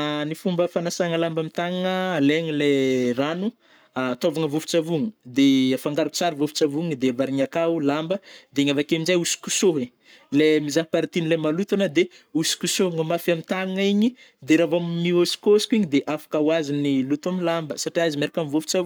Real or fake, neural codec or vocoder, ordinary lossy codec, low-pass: fake; codec, 44.1 kHz, 7.8 kbps, Pupu-Codec; none; none